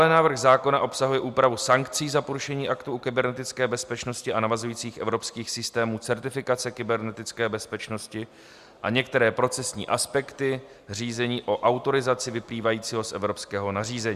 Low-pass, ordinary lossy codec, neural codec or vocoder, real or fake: 14.4 kHz; Opus, 64 kbps; none; real